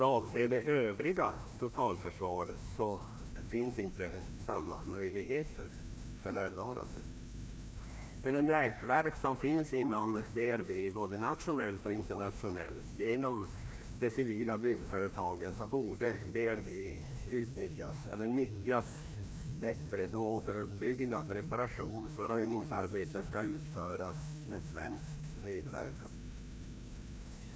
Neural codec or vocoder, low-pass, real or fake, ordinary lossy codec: codec, 16 kHz, 1 kbps, FreqCodec, larger model; none; fake; none